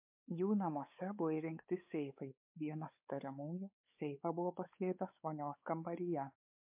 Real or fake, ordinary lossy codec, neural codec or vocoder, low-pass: fake; MP3, 32 kbps; codec, 16 kHz, 4 kbps, X-Codec, WavLM features, trained on Multilingual LibriSpeech; 3.6 kHz